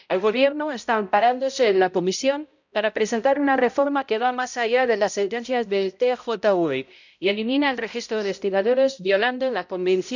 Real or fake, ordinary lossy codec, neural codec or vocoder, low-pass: fake; none; codec, 16 kHz, 0.5 kbps, X-Codec, HuBERT features, trained on balanced general audio; 7.2 kHz